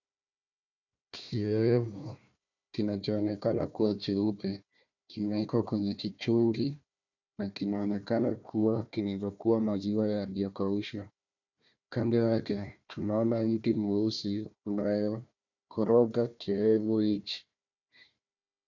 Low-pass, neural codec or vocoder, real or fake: 7.2 kHz; codec, 16 kHz, 1 kbps, FunCodec, trained on Chinese and English, 50 frames a second; fake